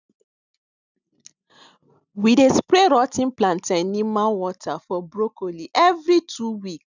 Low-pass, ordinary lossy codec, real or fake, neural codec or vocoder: 7.2 kHz; none; real; none